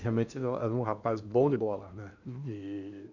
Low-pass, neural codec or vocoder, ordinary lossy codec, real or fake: 7.2 kHz; codec, 16 kHz in and 24 kHz out, 0.8 kbps, FocalCodec, streaming, 65536 codes; none; fake